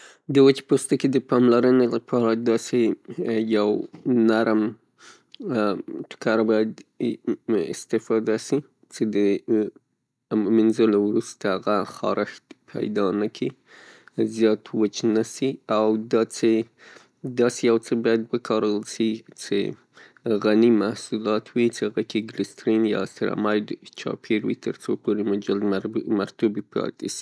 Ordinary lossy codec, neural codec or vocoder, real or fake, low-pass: none; none; real; none